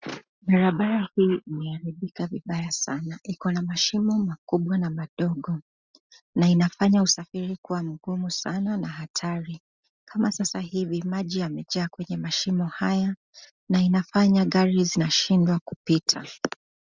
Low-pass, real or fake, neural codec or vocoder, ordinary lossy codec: 7.2 kHz; real; none; Opus, 64 kbps